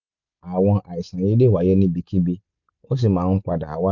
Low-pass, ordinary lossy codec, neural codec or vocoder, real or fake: 7.2 kHz; none; none; real